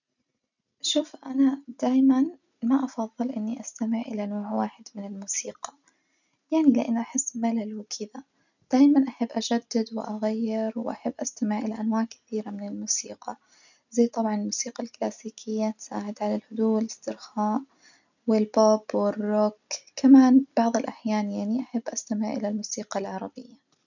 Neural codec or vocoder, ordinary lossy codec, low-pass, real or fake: none; none; 7.2 kHz; real